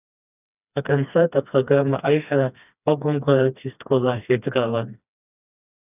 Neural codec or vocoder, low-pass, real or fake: codec, 16 kHz, 2 kbps, FreqCodec, smaller model; 3.6 kHz; fake